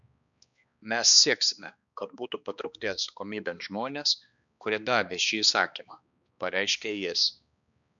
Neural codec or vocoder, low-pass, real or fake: codec, 16 kHz, 2 kbps, X-Codec, HuBERT features, trained on balanced general audio; 7.2 kHz; fake